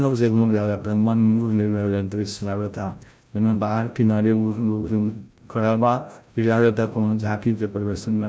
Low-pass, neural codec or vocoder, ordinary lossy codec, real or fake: none; codec, 16 kHz, 0.5 kbps, FreqCodec, larger model; none; fake